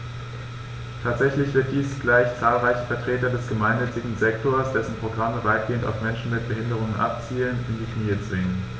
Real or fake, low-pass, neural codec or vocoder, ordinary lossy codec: real; none; none; none